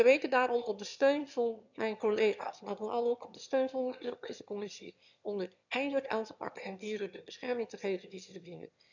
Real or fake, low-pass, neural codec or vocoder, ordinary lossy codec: fake; 7.2 kHz; autoencoder, 22.05 kHz, a latent of 192 numbers a frame, VITS, trained on one speaker; none